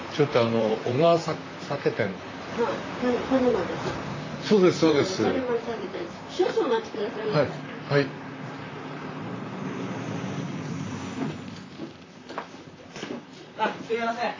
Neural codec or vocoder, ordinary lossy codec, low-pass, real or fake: vocoder, 44.1 kHz, 128 mel bands, Pupu-Vocoder; AAC, 32 kbps; 7.2 kHz; fake